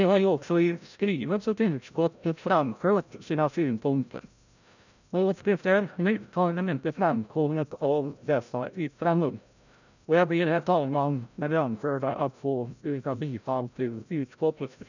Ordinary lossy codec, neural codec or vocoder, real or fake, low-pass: none; codec, 16 kHz, 0.5 kbps, FreqCodec, larger model; fake; 7.2 kHz